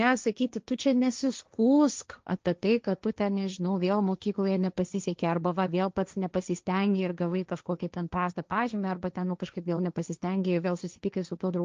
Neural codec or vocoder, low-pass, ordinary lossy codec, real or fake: codec, 16 kHz, 1.1 kbps, Voila-Tokenizer; 7.2 kHz; Opus, 24 kbps; fake